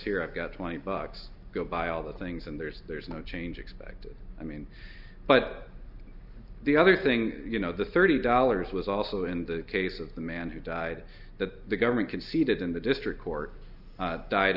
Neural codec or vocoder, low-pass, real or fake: none; 5.4 kHz; real